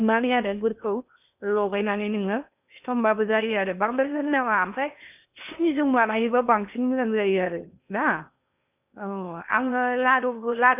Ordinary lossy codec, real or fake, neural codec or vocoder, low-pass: none; fake; codec, 16 kHz in and 24 kHz out, 0.8 kbps, FocalCodec, streaming, 65536 codes; 3.6 kHz